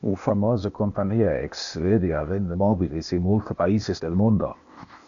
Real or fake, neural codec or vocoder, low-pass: fake; codec, 16 kHz, 0.8 kbps, ZipCodec; 7.2 kHz